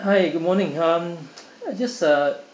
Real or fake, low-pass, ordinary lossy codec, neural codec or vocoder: real; none; none; none